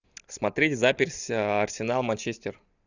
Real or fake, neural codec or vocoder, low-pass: real; none; 7.2 kHz